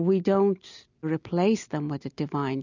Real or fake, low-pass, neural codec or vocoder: real; 7.2 kHz; none